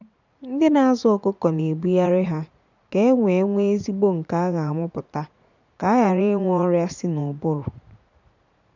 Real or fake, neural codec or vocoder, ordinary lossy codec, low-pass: fake; vocoder, 44.1 kHz, 80 mel bands, Vocos; none; 7.2 kHz